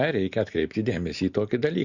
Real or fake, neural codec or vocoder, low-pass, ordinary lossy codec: real; none; 7.2 kHz; Opus, 64 kbps